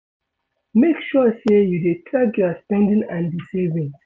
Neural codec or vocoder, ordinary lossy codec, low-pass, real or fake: none; none; none; real